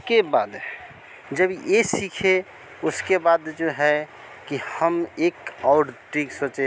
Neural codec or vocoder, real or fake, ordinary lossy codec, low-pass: none; real; none; none